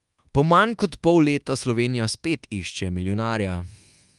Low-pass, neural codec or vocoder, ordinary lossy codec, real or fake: 10.8 kHz; codec, 24 kHz, 1.2 kbps, DualCodec; Opus, 32 kbps; fake